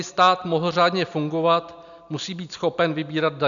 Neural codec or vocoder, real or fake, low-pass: none; real; 7.2 kHz